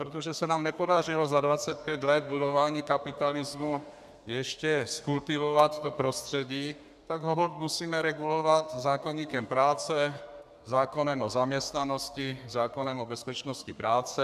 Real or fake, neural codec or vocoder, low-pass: fake; codec, 32 kHz, 1.9 kbps, SNAC; 14.4 kHz